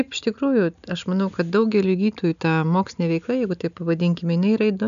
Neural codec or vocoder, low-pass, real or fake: none; 7.2 kHz; real